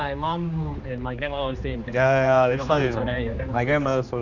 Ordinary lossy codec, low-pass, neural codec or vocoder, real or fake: none; 7.2 kHz; codec, 16 kHz, 2 kbps, X-Codec, HuBERT features, trained on general audio; fake